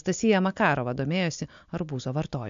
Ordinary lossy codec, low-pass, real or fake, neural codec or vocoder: MP3, 64 kbps; 7.2 kHz; real; none